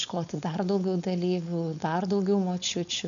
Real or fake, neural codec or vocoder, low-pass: fake; codec, 16 kHz, 4.8 kbps, FACodec; 7.2 kHz